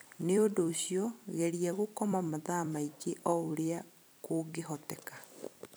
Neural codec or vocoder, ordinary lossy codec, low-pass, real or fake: vocoder, 44.1 kHz, 128 mel bands every 256 samples, BigVGAN v2; none; none; fake